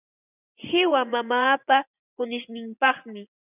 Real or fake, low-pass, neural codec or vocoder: fake; 3.6 kHz; vocoder, 24 kHz, 100 mel bands, Vocos